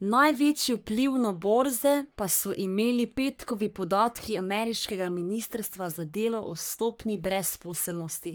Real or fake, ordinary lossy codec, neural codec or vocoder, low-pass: fake; none; codec, 44.1 kHz, 3.4 kbps, Pupu-Codec; none